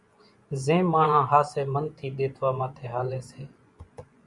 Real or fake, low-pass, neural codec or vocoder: fake; 10.8 kHz; vocoder, 24 kHz, 100 mel bands, Vocos